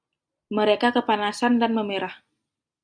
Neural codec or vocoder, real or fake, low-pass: none; real; 9.9 kHz